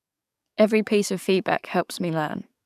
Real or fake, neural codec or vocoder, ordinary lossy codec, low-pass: fake; codec, 44.1 kHz, 7.8 kbps, DAC; none; 14.4 kHz